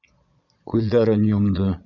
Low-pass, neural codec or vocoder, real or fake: 7.2 kHz; vocoder, 22.05 kHz, 80 mel bands, Vocos; fake